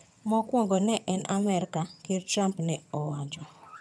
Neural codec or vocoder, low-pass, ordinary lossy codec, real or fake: vocoder, 22.05 kHz, 80 mel bands, HiFi-GAN; none; none; fake